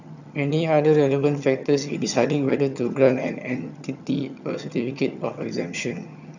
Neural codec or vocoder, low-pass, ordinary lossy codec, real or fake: vocoder, 22.05 kHz, 80 mel bands, HiFi-GAN; 7.2 kHz; none; fake